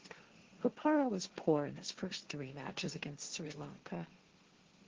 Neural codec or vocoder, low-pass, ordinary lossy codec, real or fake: codec, 16 kHz, 1.1 kbps, Voila-Tokenizer; 7.2 kHz; Opus, 16 kbps; fake